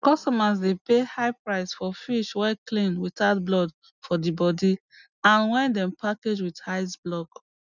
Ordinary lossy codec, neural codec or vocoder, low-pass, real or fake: none; none; 7.2 kHz; real